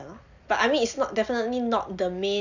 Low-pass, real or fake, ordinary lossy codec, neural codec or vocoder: 7.2 kHz; real; none; none